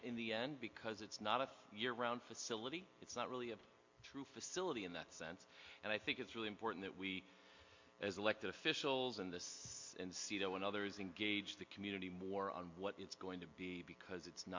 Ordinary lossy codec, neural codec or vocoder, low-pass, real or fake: MP3, 48 kbps; none; 7.2 kHz; real